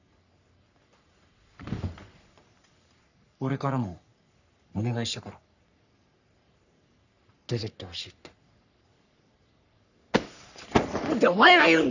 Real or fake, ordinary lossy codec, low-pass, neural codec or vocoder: fake; none; 7.2 kHz; codec, 44.1 kHz, 3.4 kbps, Pupu-Codec